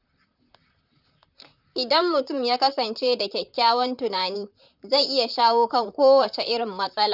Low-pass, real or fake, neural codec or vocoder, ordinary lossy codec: 5.4 kHz; fake; vocoder, 44.1 kHz, 128 mel bands, Pupu-Vocoder; none